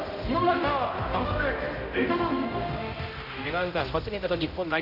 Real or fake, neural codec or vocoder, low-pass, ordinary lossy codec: fake; codec, 16 kHz, 0.5 kbps, X-Codec, HuBERT features, trained on general audio; 5.4 kHz; none